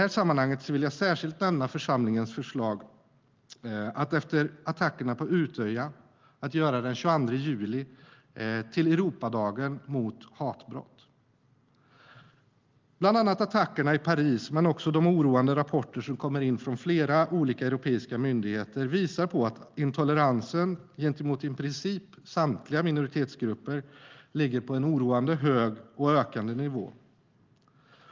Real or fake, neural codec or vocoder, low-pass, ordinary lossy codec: real; none; 7.2 kHz; Opus, 32 kbps